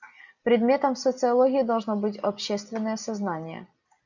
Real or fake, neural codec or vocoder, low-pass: real; none; 7.2 kHz